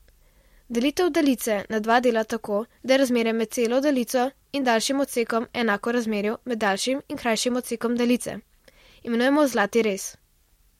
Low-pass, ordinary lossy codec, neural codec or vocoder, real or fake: 19.8 kHz; MP3, 64 kbps; none; real